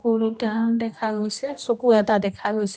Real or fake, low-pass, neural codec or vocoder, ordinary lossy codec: fake; none; codec, 16 kHz, 1 kbps, X-Codec, HuBERT features, trained on general audio; none